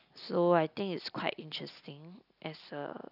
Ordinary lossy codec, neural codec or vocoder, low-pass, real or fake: none; none; 5.4 kHz; real